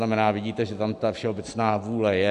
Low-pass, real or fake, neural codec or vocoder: 10.8 kHz; real; none